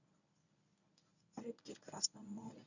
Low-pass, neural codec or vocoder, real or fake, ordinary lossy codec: 7.2 kHz; codec, 24 kHz, 0.9 kbps, WavTokenizer, medium speech release version 1; fake; none